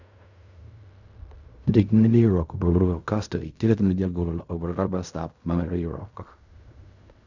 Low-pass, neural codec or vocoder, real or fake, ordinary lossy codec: 7.2 kHz; codec, 16 kHz in and 24 kHz out, 0.4 kbps, LongCat-Audio-Codec, fine tuned four codebook decoder; fake; none